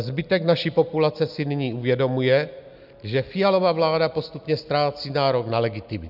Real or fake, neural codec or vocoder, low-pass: real; none; 5.4 kHz